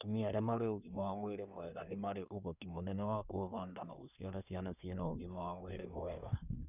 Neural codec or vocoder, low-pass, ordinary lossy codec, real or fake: codec, 24 kHz, 1 kbps, SNAC; 3.6 kHz; none; fake